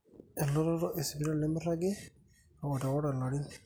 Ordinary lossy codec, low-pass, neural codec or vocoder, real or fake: none; none; none; real